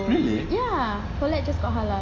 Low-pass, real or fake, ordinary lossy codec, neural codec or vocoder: 7.2 kHz; real; AAC, 32 kbps; none